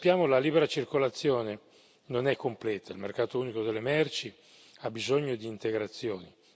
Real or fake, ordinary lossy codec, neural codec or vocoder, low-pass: real; none; none; none